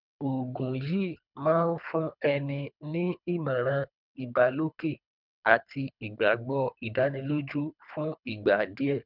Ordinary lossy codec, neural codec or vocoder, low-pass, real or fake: none; codec, 24 kHz, 3 kbps, HILCodec; 5.4 kHz; fake